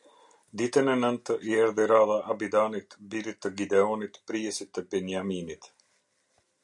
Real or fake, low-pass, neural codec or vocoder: real; 10.8 kHz; none